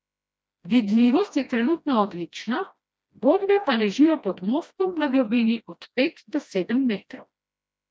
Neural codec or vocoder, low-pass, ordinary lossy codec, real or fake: codec, 16 kHz, 1 kbps, FreqCodec, smaller model; none; none; fake